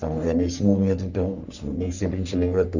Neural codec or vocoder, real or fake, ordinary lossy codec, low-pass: codec, 44.1 kHz, 3.4 kbps, Pupu-Codec; fake; none; 7.2 kHz